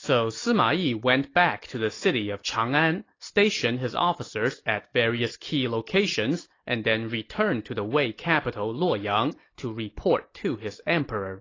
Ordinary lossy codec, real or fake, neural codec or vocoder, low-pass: AAC, 32 kbps; real; none; 7.2 kHz